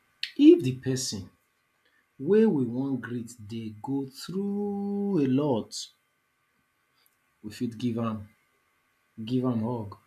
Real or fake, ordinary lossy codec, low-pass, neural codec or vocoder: real; none; 14.4 kHz; none